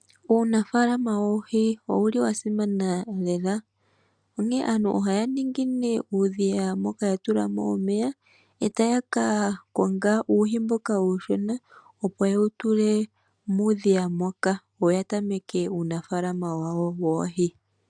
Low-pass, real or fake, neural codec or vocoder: 9.9 kHz; real; none